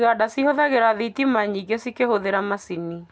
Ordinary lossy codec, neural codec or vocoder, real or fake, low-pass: none; none; real; none